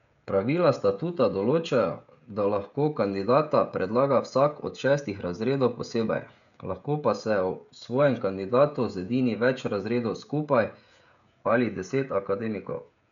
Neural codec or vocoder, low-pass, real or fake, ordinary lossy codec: codec, 16 kHz, 16 kbps, FreqCodec, smaller model; 7.2 kHz; fake; none